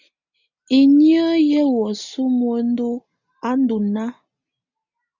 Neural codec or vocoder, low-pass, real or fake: none; 7.2 kHz; real